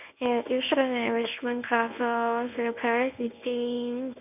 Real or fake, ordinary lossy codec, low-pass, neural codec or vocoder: fake; none; 3.6 kHz; codec, 24 kHz, 0.9 kbps, WavTokenizer, medium speech release version 1